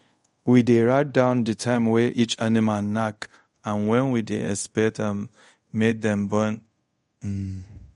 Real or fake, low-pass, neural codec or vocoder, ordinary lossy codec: fake; 10.8 kHz; codec, 24 kHz, 0.5 kbps, DualCodec; MP3, 48 kbps